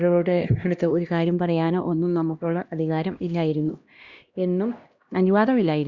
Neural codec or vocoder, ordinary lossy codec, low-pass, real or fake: codec, 16 kHz, 1 kbps, X-Codec, HuBERT features, trained on LibriSpeech; Opus, 64 kbps; 7.2 kHz; fake